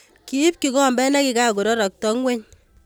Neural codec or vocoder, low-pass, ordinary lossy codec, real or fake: none; none; none; real